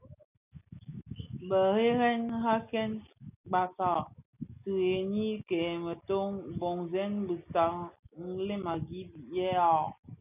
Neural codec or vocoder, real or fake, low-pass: none; real; 3.6 kHz